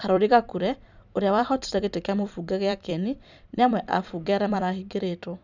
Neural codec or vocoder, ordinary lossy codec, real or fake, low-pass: vocoder, 44.1 kHz, 128 mel bands every 256 samples, BigVGAN v2; none; fake; 7.2 kHz